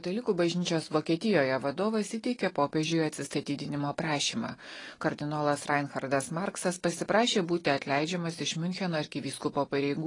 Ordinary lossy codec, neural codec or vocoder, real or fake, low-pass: AAC, 32 kbps; none; real; 10.8 kHz